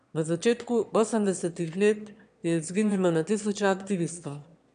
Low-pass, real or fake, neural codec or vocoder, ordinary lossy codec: 9.9 kHz; fake; autoencoder, 22.05 kHz, a latent of 192 numbers a frame, VITS, trained on one speaker; none